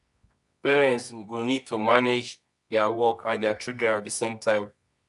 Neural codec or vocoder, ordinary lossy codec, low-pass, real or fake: codec, 24 kHz, 0.9 kbps, WavTokenizer, medium music audio release; none; 10.8 kHz; fake